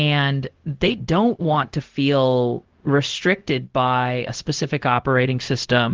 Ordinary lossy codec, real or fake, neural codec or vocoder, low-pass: Opus, 16 kbps; fake; codec, 16 kHz, 0.4 kbps, LongCat-Audio-Codec; 7.2 kHz